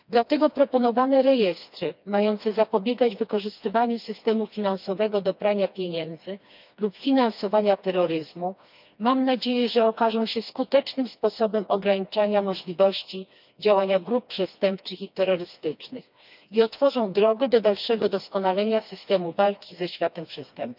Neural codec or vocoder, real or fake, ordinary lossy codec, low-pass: codec, 16 kHz, 2 kbps, FreqCodec, smaller model; fake; none; 5.4 kHz